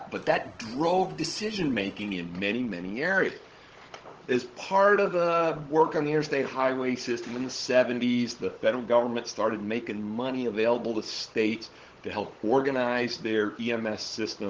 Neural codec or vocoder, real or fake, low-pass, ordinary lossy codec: codec, 16 kHz, 16 kbps, FunCodec, trained on Chinese and English, 50 frames a second; fake; 7.2 kHz; Opus, 16 kbps